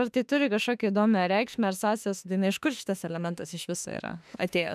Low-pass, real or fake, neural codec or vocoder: 14.4 kHz; fake; autoencoder, 48 kHz, 32 numbers a frame, DAC-VAE, trained on Japanese speech